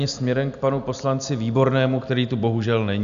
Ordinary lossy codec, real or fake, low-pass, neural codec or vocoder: MP3, 96 kbps; real; 7.2 kHz; none